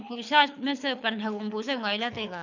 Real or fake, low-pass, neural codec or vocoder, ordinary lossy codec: fake; 7.2 kHz; codec, 24 kHz, 6 kbps, HILCodec; none